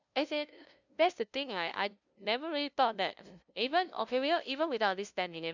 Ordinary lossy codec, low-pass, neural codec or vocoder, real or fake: none; 7.2 kHz; codec, 16 kHz, 0.5 kbps, FunCodec, trained on LibriTTS, 25 frames a second; fake